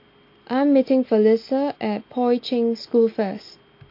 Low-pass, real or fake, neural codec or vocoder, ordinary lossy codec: 5.4 kHz; real; none; MP3, 32 kbps